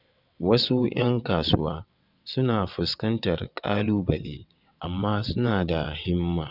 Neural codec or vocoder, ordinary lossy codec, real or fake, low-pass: vocoder, 22.05 kHz, 80 mel bands, WaveNeXt; none; fake; 5.4 kHz